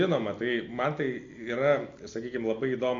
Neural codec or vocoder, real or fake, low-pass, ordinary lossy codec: none; real; 7.2 kHz; AAC, 48 kbps